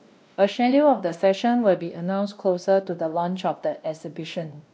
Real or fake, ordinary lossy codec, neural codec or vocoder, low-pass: fake; none; codec, 16 kHz, 1 kbps, X-Codec, WavLM features, trained on Multilingual LibriSpeech; none